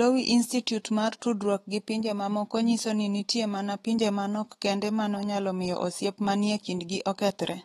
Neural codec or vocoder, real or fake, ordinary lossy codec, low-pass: autoencoder, 48 kHz, 128 numbers a frame, DAC-VAE, trained on Japanese speech; fake; AAC, 32 kbps; 19.8 kHz